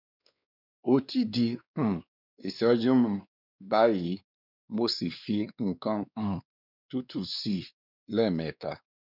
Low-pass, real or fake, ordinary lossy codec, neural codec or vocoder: 5.4 kHz; fake; none; codec, 16 kHz, 2 kbps, X-Codec, WavLM features, trained on Multilingual LibriSpeech